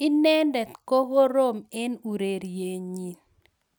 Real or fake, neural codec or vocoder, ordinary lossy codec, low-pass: real; none; none; none